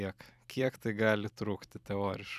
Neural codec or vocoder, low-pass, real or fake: vocoder, 44.1 kHz, 128 mel bands every 256 samples, BigVGAN v2; 14.4 kHz; fake